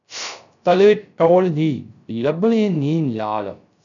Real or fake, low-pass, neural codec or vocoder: fake; 7.2 kHz; codec, 16 kHz, 0.3 kbps, FocalCodec